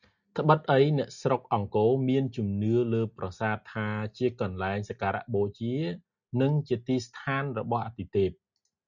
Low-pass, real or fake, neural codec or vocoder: 7.2 kHz; real; none